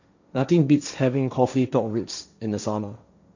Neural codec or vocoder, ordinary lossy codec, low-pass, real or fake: codec, 16 kHz, 1.1 kbps, Voila-Tokenizer; none; 7.2 kHz; fake